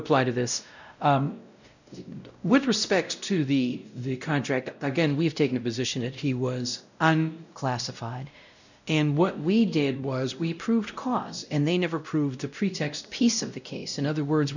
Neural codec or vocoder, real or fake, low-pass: codec, 16 kHz, 0.5 kbps, X-Codec, WavLM features, trained on Multilingual LibriSpeech; fake; 7.2 kHz